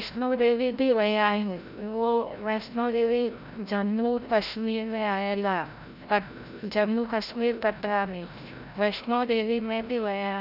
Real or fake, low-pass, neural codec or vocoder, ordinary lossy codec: fake; 5.4 kHz; codec, 16 kHz, 0.5 kbps, FreqCodec, larger model; none